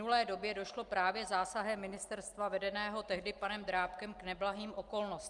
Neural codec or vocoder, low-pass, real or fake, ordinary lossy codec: none; 10.8 kHz; real; Opus, 32 kbps